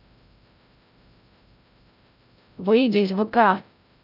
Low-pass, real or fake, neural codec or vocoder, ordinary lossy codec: 5.4 kHz; fake; codec, 16 kHz, 0.5 kbps, FreqCodec, larger model; none